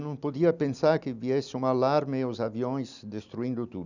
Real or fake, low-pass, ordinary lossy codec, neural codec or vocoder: real; 7.2 kHz; none; none